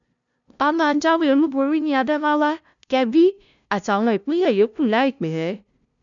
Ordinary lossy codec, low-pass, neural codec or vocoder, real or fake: none; 7.2 kHz; codec, 16 kHz, 0.5 kbps, FunCodec, trained on LibriTTS, 25 frames a second; fake